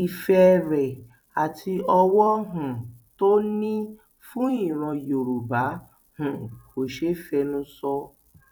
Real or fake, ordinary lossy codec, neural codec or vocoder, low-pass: fake; none; vocoder, 44.1 kHz, 128 mel bands every 256 samples, BigVGAN v2; 19.8 kHz